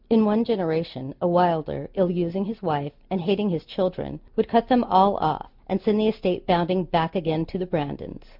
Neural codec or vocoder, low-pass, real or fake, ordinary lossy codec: none; 5.4 kHz; real; MP3, 48 kbps